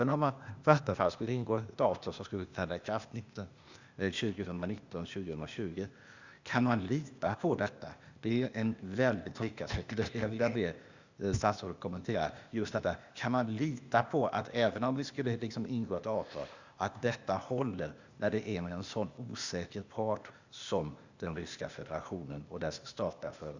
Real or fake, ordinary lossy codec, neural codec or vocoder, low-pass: fake; Opus, 64 kbps; codec, 16 kHz, 0.8 kbps, ZipCodec; 7.2 kHz